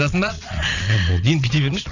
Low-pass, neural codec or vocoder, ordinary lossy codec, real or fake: 7.2 kHz; vocoder, 22.05 kHz, 80 mel bands, Vocos; none; fake